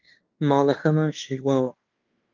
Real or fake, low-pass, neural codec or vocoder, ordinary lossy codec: fake; 7.2 kHz; codec, 16 kHz in and 24 kHz out, 0.9 kbps, LongCat-Audio-Codec, fine tuned four codebook decoder; Opus, 24 kbps